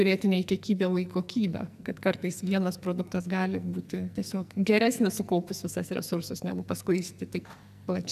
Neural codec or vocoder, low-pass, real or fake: codec, 44.1 kHz, 2.6 kbps, SNAC; 14.4 kHz; fake